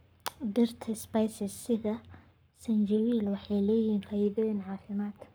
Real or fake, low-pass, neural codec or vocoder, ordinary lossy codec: fake; none; codec, 44.1 kHz, 7.8 kbps, Pupu-Codec; none